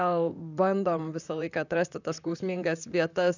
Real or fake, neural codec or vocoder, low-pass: fake; vocoder, 44.1 kHz, 128 mel bands, Pupu-Vocoder; 7.2 kHz